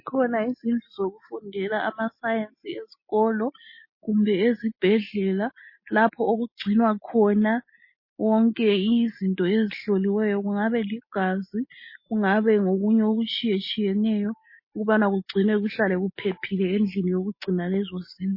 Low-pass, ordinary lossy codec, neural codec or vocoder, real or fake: 5.4 kHz; MP3, 24 kbps; none; real